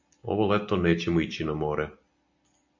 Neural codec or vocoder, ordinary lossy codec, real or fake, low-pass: none; MP3, 64 kbps; real; 7.2 kHz